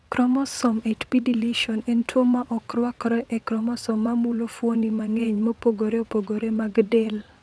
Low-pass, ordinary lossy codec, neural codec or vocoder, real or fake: none; none; vocoder, 22.05 kHz, 80 mel bands, WaveNeXt; fake